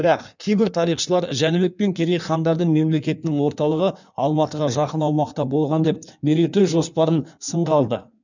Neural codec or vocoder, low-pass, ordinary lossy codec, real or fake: codec, 16 kHz in and 24 kHz out, 1.1 kbps, FireRedTTS-2 codec; 7.2 kHz; none; fake